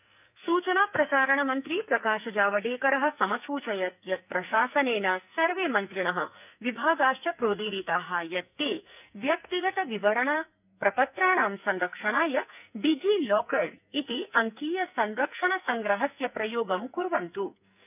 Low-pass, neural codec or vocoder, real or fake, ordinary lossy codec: 3.6 kHz; codec, 44.1 kHz, 2.6 kbps, SNAC; fake; none